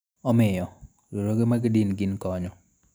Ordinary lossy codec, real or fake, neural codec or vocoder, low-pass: none; real; none; none